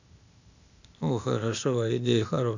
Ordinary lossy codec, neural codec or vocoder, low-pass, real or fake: none; codec, 16 kHz, 0.8 kbps, ZipCodec; 7.2 kHz; fake